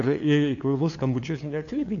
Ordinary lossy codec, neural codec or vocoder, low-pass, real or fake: AAC, 48 kbps; codec, 16 kHz, 2 kbps, FunCodec, trained on LibriTTS, 25 frames a second; 7.2 kHz; fake